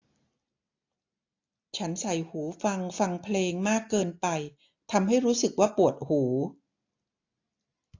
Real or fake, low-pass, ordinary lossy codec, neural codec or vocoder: real; 7.2 kHz; AAC, 48 kbps; none